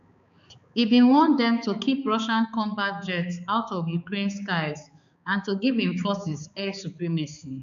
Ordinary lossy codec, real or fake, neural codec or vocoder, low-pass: AAC, 96 kbps; fake; codec, 16 kHz, 4 kbps, X-Codec, HuBERT features, trained on balanced general audio; 7.2 kHz